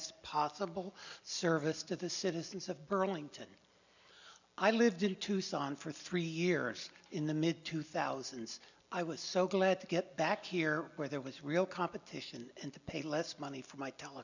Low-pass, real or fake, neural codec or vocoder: 7.2 kHz; real; none